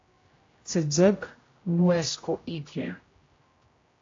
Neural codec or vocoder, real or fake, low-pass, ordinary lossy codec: codec, 16 kHz, 0.5 kbps, X-Codec, HuBERT features, trained on general audio; fake; 7.2 kHz; AAC, 32 kbps